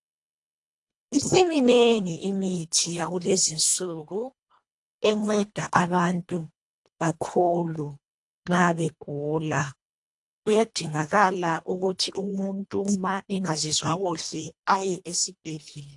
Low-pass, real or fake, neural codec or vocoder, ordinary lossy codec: 10.8 kHz; fake; codec, 24 kHz, 1.5 kbps, HILCodec; AAC, 64 kbps